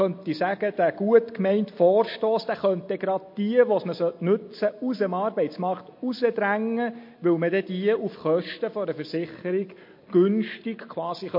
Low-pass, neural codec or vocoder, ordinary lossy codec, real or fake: 5.4 kHz; none; MP3, 32 kbps; real